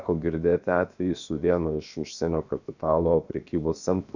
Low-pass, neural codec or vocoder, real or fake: 7.2 kHz; codec, 16 kHz, about 1 kbps, DyCAST, with the encoder's durations; fake